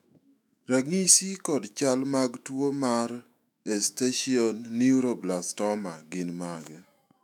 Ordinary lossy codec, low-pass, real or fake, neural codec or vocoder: none; 19.8 kHz; fake; autoencoder, 48 kHz, 128 numbers a frame, DAC-VAE, trained on Japanese speech